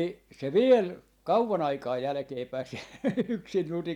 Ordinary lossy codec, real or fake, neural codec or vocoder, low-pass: none; real; none; 19.8 kHz